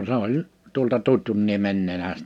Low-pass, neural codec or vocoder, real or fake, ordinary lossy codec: 19.8 kHz; none; real; none